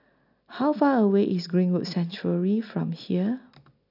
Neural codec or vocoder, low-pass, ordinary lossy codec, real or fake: none; 5.4 kHz; none; real